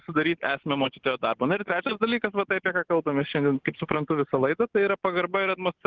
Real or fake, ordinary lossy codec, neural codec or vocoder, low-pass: real; Opus, 16 kbps; none; 7.2 kHz